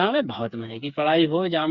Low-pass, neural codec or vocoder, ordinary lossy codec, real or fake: 7.2 kHz; codec, 16 kHz, 4 kbps, FreqCodec, smaller model; Opus, 64 kbps; fake